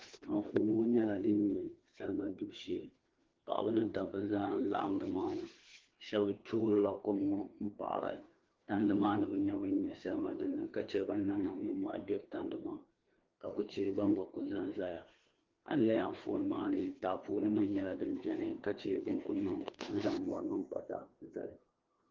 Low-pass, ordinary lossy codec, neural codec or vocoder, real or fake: 7.2 kHz; Opus, 16 kbps; codec, 16 kHz, 2 kbps, FreqCodec, larger model; fake